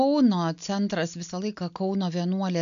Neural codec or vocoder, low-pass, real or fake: none; 7.2 kHz; real